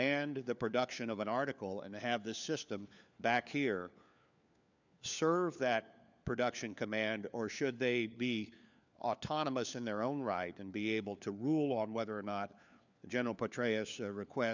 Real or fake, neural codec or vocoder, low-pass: fake; codec, 16 kHz, 4 kbps, FunCodec, trained on LibriTTS, 50 frames a second; 7.2 kHz